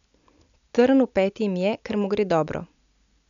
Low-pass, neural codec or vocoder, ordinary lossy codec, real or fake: 7.2 kHz; none; none; real